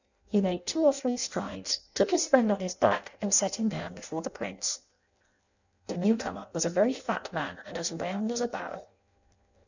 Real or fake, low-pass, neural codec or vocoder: fake; 7.2 kHz; codec, 16 kHz in and 24 kHz out, 0.6 kbps, FireRedTTS-2 codec